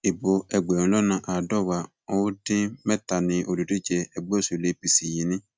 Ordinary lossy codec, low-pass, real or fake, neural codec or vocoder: none; none; real; none